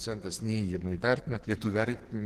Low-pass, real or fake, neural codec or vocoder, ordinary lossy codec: 14.4 kHz; fake; codec, 44.1 kHz, 2.6 kbps, SNAC; Opus, 16 kbps